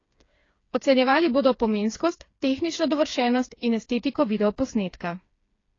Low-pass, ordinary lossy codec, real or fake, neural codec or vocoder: 7.2 kHz; AAC, 32 kbps; fake; codec, 16 kHz, 4 kbps, FreqCodec, smaller model